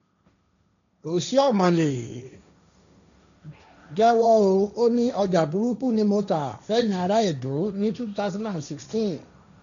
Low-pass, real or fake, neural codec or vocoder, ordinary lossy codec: 7.2 kHz; fake; codec, 16 kHz, 1.1 kbps, Voila-Tokenizer; none